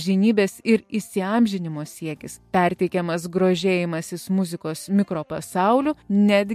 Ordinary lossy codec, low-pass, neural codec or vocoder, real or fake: MP3, 64 kbps; 14.4 kHz; none; real